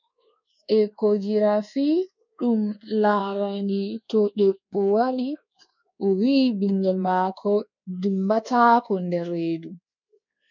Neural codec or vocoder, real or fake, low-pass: codec, 24 kHz, 1.2 kbps, DualCodec; fake; 7.2 kHz